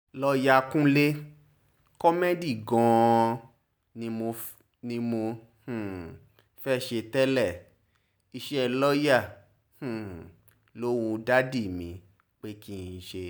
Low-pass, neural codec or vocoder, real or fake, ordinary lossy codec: none; none; real; none